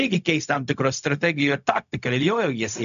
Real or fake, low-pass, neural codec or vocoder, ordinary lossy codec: fake; 7.2 kHz; codec, 16 kHz, 0.4 kbps, LongCat-Audio-Codec; MP3, 96 kbps